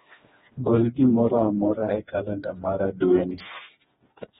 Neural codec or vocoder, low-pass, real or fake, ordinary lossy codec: codec, 16 kHz, 2 kbps, FreqCodec, smaller model; 7.2 kHz; fake; AAC, 16 kbps